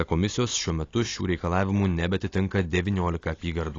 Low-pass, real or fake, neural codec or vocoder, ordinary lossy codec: 7.2 kHz; real; none; AAC, 32 kbps